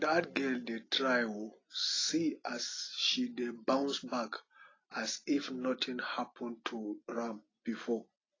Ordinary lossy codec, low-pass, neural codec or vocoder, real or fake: AAC, 32 kbps; 7.2 kHz; none; real